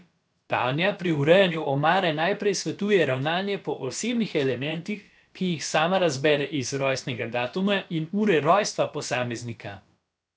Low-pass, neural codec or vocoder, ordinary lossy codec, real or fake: none; codec, 16 kHz, about 1 kbps, DyCAST, with the encoder's durations; none; fake